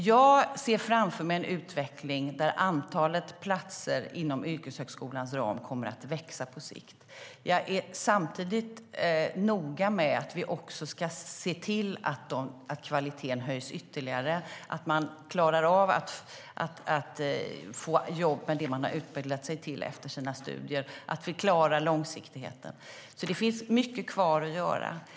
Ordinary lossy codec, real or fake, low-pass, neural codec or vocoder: none; real; none; none